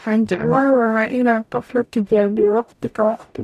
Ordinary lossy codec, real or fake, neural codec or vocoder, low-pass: none; fake; codec, 44.1 kHz, 0.9 kbps, DAC; 14.4 kHz